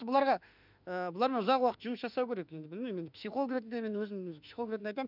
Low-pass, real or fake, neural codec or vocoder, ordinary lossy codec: 5.4 kHz; fake; autoencoder, 48 kHz, 32 numbers a frame, DAC-VAE, trained on Japanese speech; none